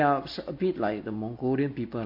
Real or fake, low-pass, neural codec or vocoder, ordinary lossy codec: real; 5.4 kHz; none; MP3, 32 kbps